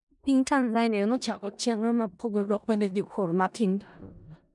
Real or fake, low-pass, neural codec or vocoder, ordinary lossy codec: fake; 10.8 kHz; codec, 16 kHz in and 24 kHz out, 0.4 kbps, LongCat-Audio-Codec, four codebook decoder; none